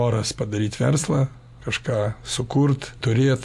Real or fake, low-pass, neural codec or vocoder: real; 14.4 kHz; none